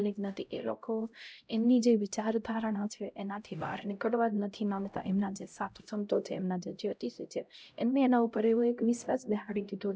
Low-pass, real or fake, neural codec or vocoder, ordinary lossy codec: none; fake; codec, 16 kHz, 0.5 kbps, X-Codec, HuBERT features, trained on LibriSpeech; none